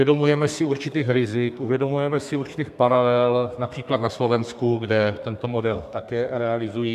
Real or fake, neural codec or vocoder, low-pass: fake; codec, 32 kHz, 1.9 kbps, SNAC; 14.4 kHz